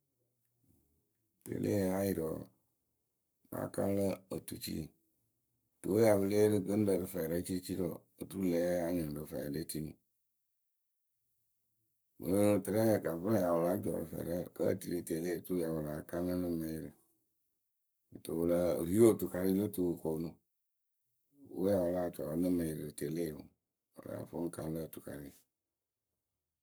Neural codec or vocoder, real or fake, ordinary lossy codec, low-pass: codec, 44.1 kHz, 7.8 kbps, Pupu-Codec; fake; none; none